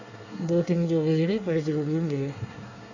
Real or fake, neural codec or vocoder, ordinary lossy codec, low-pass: fake; codec, 24 kHz, 1 kbps, SNAC; none; 7.2 kHz